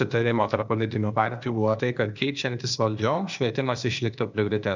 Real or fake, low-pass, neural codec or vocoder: fake; 7.2 kHz; codec, 16 kHz, 0.8 kbps, ZipCodec